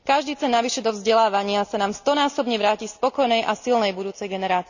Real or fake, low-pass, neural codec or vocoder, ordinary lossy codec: real; 7.2 kHz; none; none